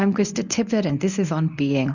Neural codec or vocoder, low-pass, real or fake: codec, 24 kHz, 0.9 kbps, WavTokenizer, medium speech release version 1; 7.2 kHz; fake